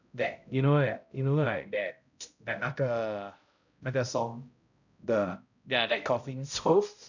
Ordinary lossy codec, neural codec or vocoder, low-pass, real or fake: none; codec, 16 kHz, 0.5 kbps, X-Codec, HuBERT features, trained on balanced general audio; 7.2 kHz; fake